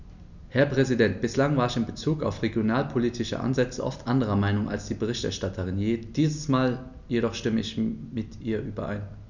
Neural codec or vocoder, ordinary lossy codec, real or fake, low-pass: none; none; real; 7.2 kHz